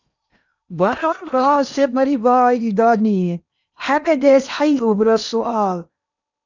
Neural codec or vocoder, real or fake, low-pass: codec, 16 kHz in and 24 kHz out, 0.8 kbps, FocalCodec, streaming, 65536 codes; fake; 7.2 kHz